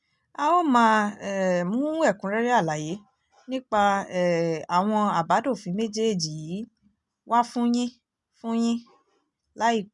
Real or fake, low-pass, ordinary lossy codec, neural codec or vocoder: real; 10.8 kHz; none; none